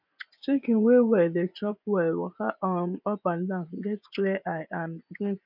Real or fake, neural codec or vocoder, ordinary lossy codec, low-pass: fake; vocoder, 24 kHz, 100 mel bands, Vocos; none; 5.4 kHz